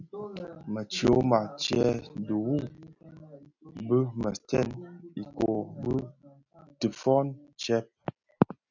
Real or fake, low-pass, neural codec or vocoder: real; 7.2 kHz; none